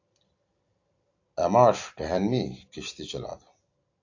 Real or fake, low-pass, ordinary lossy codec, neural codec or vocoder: real; 7.2 kHz; AAC, 48 kbps; none